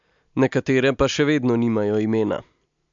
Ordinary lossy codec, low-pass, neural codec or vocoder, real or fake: MP3, 64 kbps; 7.2 kHz; none; real